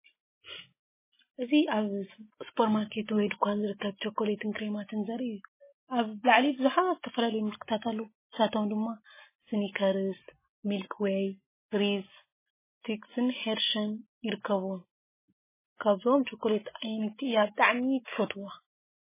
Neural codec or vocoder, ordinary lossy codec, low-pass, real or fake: none; MP3, 16 kbps; 3.6 kHz; real